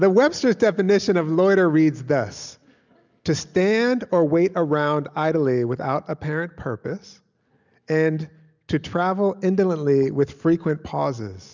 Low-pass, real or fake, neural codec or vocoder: 7.2 kHz; real; none